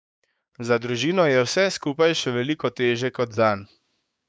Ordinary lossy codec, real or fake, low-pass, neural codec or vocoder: none; fake; none; codec, 16 kHz, 6 kbps, DAC